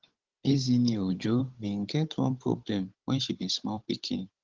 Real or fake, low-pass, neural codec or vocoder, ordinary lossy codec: fake; 7.2 kHz; codec, 16 kHz, 16 kbps, FunCodec, trained on Chinese and English, 50 frames a second; Opus, 16 kbps